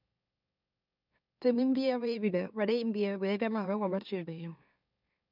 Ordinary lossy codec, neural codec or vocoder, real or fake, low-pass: none; autoencoder, 44.1 kHz, a latent of 192 numbers a frame, MeloTTS; fake; 5.4 kHz